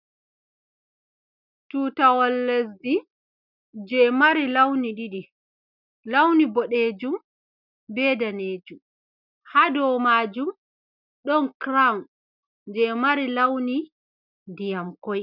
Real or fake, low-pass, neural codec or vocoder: real; 5.4 kHz; none